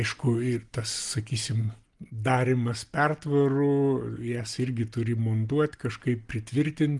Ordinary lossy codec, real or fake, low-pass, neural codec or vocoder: Opus, 24 kbps; real; 10.8 kHz; none